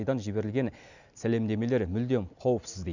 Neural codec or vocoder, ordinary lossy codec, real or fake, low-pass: none; none; real; 7.2 kHz